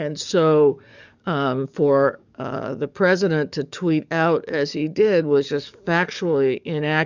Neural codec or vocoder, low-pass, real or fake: codec, 44.1 kHz, 7.8 kbps, DAC; 7.2 kHz; fake